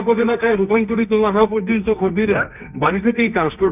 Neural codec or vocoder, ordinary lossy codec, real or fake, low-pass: codec, 24 kHz, 0.9 kbps, WavTokenizer, medium music audio release; none; fake; 3.6 kHz